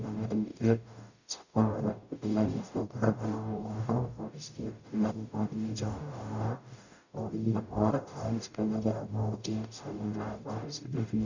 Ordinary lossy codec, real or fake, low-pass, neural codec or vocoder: none; fake; 7.2 kHz; codec, 44.1 kHz, 0.9 kbps, DAC